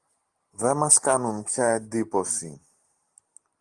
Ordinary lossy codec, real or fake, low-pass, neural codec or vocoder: Opus, 24 kbps; real; 10.8 kHz; none